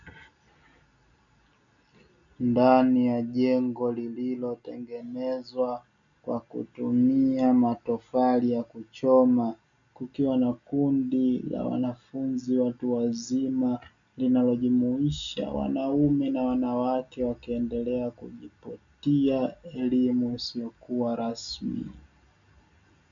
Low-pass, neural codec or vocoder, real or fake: 7.2 kHz; none; real